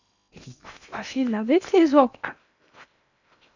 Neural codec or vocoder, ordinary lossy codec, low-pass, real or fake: codec, 16 kHz in and 24 kHz out, 0.8 kbps, FocalCodec, streaming, 65536 codes; none; 7.2 kHz; fake